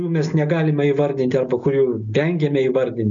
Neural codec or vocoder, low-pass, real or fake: none; 7.2 kHz; real